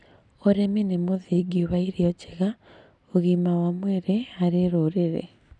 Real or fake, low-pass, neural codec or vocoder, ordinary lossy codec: real; 10.8 kHz; none; none